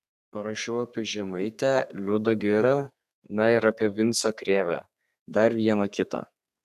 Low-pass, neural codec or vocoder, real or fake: 14.4 kHz; codec, 32 kHz, 1.9 kbps, SNAC; fake